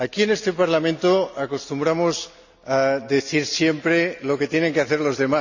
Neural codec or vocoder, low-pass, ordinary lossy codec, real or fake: none; 7.2 kHz; none; real